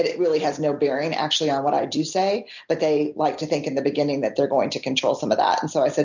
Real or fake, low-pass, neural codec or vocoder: real; 7.2 kHz; none